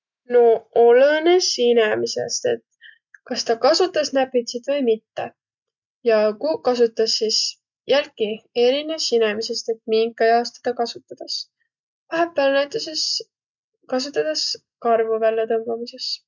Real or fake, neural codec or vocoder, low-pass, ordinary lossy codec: real; none; 7.2 kHz; none